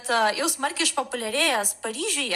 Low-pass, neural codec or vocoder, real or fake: 14.4 kHz; vocoder, 44.1 kHz, 128 mel bands every 256 samples, BigVGAN v2; fake